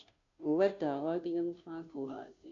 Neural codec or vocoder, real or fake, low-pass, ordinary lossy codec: codec, 16 kHz, 0.5 kbps, FunCodec, trained on Chinese and English, 25 frames a second; fake; 7.2 kHz; none